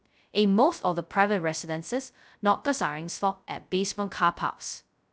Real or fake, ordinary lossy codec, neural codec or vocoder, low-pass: fake; none; codec, 16 kHz, 0.2 kbps, FocalCodec; none